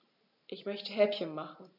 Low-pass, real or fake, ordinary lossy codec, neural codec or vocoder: 5.4 kHz; real; none; none